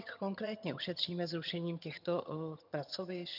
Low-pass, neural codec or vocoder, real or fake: 5.4 kHz; vocoder, 22.05 kHz, 80 mel bands, HiFi-GAN; fake